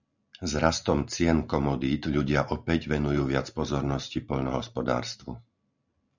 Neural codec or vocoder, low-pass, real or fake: none; 7.2 kHz; real